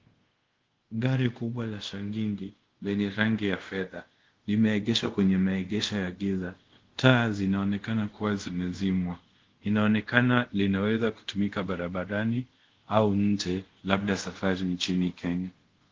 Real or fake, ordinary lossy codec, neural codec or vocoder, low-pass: fake; Opus, 16 kbps; codec, 24 kHz, 0.5 kbps, DualCodec; 7.2 kHz